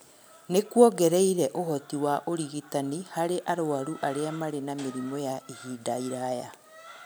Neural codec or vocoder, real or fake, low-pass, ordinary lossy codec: none; real; none; none